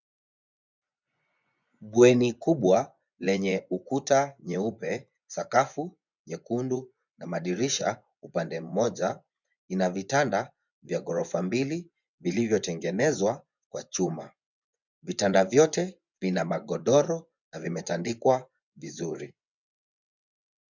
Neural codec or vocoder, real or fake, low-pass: vocoder, 24 kHz, 100 mel bands, Vocos; fake; 7.2 kHz